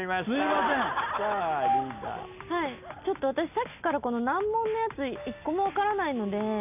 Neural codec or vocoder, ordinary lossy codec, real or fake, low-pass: none; none; real; 3.6 kHz